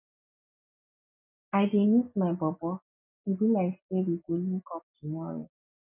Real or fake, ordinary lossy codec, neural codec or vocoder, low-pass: real; none; none; 3.6 kHz